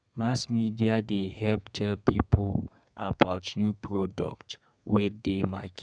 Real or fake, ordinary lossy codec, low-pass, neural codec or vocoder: fake; none; 9.9 kHz; codec, 32 kHz, 1.9 kbps, SNAC